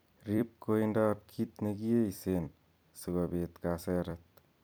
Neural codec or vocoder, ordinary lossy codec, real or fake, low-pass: vocoder, 44.1 kHz, 128 mel bands every 256 samples, BigVGAN v2; none; fake; none